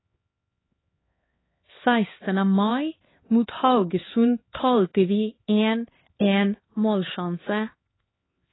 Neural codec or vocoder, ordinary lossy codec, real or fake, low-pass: codec, 16 kHz, 4 kbps, X-Codec, HuBERT features, trained on LibriSpeech; AAC, 16 kbps; fake; 7.2 kHz